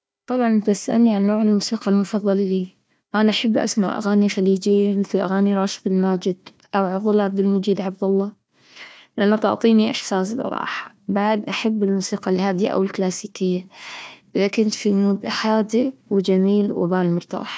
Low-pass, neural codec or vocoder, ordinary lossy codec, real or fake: none; codec, 16 kHz, 1 kbps, FunCodec, trained on Chinese and English, 50 frames a second; none; fake